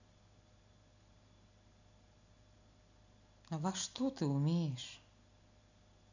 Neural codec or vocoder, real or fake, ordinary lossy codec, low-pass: none; real; none; 7.2 kHz